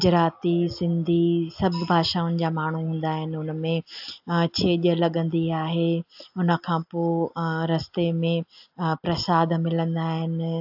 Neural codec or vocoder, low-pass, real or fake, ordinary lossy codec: none; 5.4 kHz; real; AAC, 48 kbps